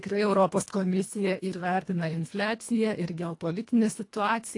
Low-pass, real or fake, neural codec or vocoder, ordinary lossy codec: 10.8 kHz; fake; codec, 24 kHz, 1.5 kbps, HILCodec; AAC, 48 kbps